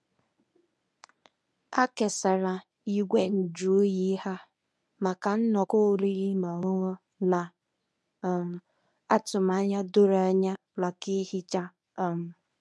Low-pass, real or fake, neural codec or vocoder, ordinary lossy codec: none; fake; codec, 24 kHz, 0.9 kbps, WavTokenizer, medium speech release version 1; none